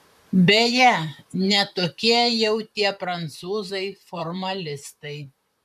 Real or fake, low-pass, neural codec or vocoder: fake; 14.4 kHz; vocoder, 44.1 kHz, 128 mel bands, Pupu-Vocoder